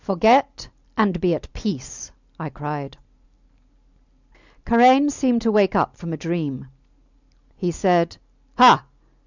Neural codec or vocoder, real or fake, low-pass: none; real; 7.2 kHz